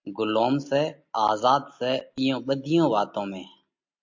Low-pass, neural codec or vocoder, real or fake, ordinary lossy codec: 7.2 kHz; none; real; MP3, 48 kbps